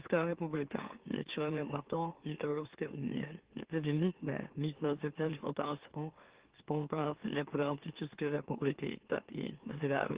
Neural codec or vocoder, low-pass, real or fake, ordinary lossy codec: autoencoder, 44.1 kHz, a latent of 192 numbers a frame, MeloTTS; 3.6 kHz; fake; Opus, 16 kbps